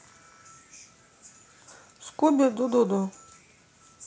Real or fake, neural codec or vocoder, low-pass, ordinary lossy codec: real; none; none; none